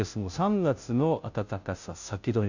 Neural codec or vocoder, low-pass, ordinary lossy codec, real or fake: codec, 16 kHz, 0.5 kbps, FunCodec, trained on Chinese and English, 25 frames a second; 7.2 kHz; none; fake